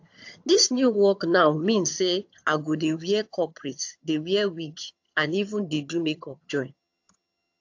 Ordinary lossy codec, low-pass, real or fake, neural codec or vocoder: AAC, 48 kbps; 7.2 kHz; fake; vocoder, 22.05 kHz, 80 mel bands, HiFi-GAN